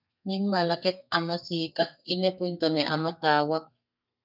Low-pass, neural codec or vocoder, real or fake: 5.4 kHz; codec, 32 kHz, 1.9 kbps, SNAC; fake